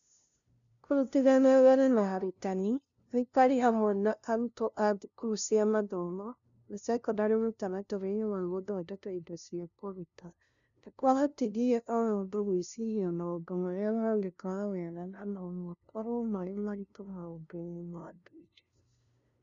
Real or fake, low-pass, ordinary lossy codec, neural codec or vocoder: fake; 7.2 kHz; none; codec, 16 kHz, 0.5 kbps, FunCodec, trained on LibriTTS, 25 frames a second